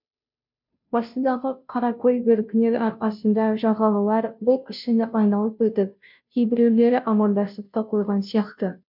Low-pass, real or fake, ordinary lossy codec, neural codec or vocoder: 5.4 kHz; fake; MP3, 48 kbps; codec, 16 kHz, 0.5 kbps, FunCodec, trained on Chinese and English, 25 frames a second